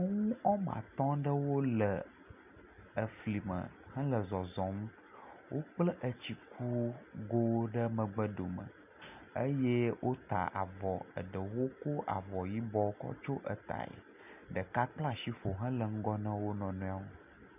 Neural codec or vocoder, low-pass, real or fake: none; 3.6 kHz; real